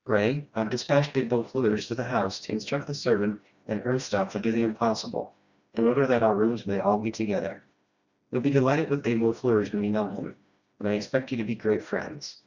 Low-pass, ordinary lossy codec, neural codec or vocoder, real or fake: 7.2 kHz; Opus, 64 kbps; codec, 16 kHz, 1 kbps, FreqCodec, smaller model; fake